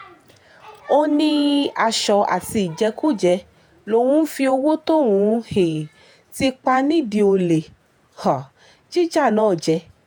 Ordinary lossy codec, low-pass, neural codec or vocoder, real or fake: none; none; vocoder, 48 kHz, 128 mel bands, Vocos; fake